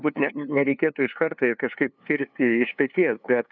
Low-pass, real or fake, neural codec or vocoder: 7.2 kHz; fake; codec, 16 kHz, 2 kbps, FunCodec, trained on LibriTTS, 25 frames a second